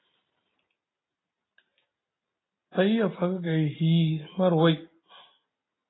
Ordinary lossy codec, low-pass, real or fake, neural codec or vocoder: AAC, 16 kbps; 7.2 kHz; real; none